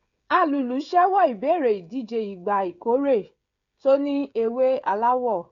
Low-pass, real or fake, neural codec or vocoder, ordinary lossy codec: 7.2 kHz; fake; codec, 16 kHz, 16 kbps, FreqCodec, smaller model; Opus, 64 kbps